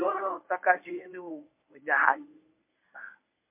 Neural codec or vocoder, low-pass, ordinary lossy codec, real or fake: codec, 24 kHz, 0.9 kbps, WavTokenizer, medium speech release version 1; 3.6 kHz; MP3, 24 kbps; fake